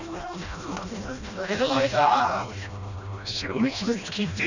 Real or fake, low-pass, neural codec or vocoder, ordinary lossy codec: fake; 7.2 kHz; codec, 16 kHz, 1 kbps, FreqCodec, smaller model; none